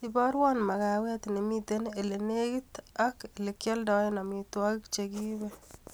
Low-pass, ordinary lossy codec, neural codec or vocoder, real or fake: none; none; none; real